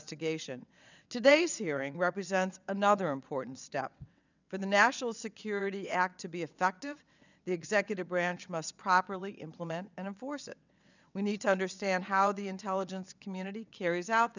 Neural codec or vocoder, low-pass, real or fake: vocoder, 22.05 kHz, 80 mel bands, WaveNeXt; 7.2 kHz; fake